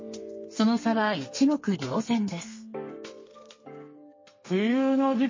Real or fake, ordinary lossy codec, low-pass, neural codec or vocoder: fake; MP3, 32 kbps; 7.2 kHz; codec, 32 kHz, 1.9 kbps, SNAC